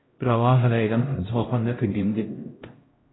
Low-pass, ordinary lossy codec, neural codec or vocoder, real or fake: 7.2 kHz; AAC, 16 kbps; codec, 16 kHz, 0.5 kbps, X-Codec, HuBERT features, trained on LibriSpeech; fake